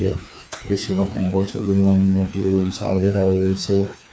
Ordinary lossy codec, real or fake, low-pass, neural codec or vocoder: none; fake; none; codec, 16 kHz, 2 kbps, FreqCodec, larger model